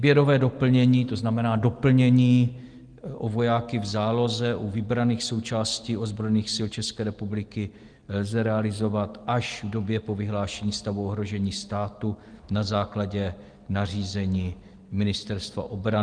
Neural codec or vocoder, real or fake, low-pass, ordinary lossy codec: none; real; 9.9 kHz; Opus, 24 kbps